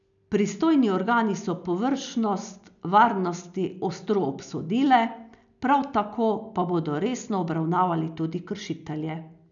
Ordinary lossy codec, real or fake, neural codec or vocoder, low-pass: none; real; none; 7.2 kHz